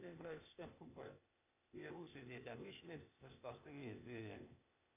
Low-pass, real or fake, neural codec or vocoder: 3.6 kHz; fake; codec, 16 kHz, 0.8 kbps, ZipCodec